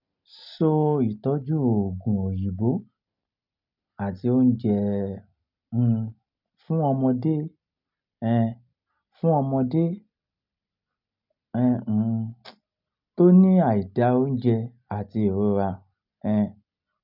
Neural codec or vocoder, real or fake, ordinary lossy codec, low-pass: none; real; none; 5.4 kHz